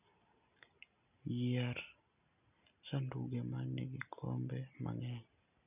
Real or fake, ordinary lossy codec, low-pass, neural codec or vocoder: real; none; 3.6 kHz; none